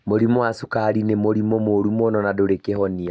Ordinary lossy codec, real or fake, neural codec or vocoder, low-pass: none; real; none; none